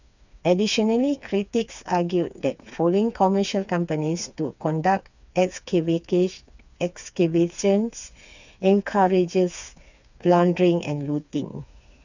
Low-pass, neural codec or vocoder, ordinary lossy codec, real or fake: 7.2 kHz; codec, 16 kHz, 4 kbps, FreqCodec, smaller model; none; fake